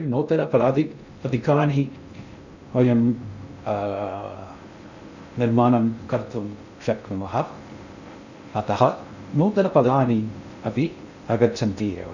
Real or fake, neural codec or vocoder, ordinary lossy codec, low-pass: fake; codec, 16 kHz in and 24 kHz out, 0.6 kbps, FocalCodec, streaming, 2048 codes; none; 7.2 kHz